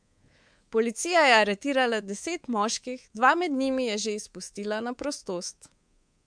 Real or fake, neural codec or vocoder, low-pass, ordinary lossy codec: fake; codec, 24 kHz, 3.1 kbps, DualCodec; 9.9 kHz; MP3, 64 kbps